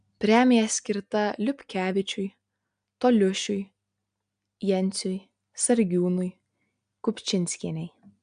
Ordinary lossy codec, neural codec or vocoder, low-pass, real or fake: AAC, 96 kbps; none; 9.9 kHz; real